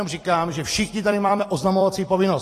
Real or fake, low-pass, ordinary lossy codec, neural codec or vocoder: fake; 14.4 kHz; AAC, 48 kbps; vocoder, 44.1 kHz, 128 mel bands every 256 samples, BigVGAN v2